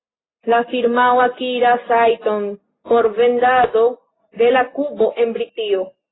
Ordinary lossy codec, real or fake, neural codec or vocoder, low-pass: AAC, 16 kbps; real; none; 7.2 kHz